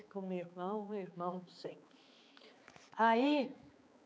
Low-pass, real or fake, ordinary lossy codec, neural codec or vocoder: none; fake; none; codec, 16 kHz, 4 kbps, X-Codec, HuBERT features, trained on balanced general audio